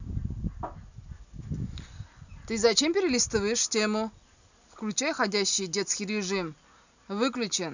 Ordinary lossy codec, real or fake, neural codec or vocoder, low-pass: none; real; none; 7.2 kHz